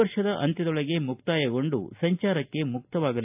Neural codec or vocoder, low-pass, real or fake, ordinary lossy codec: none; 3.6 kHz; real; AAC, 32 kbps